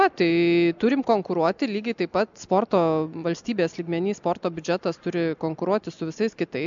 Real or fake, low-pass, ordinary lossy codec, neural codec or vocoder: real; 7.2 kHz; MP3, 64 kbps; none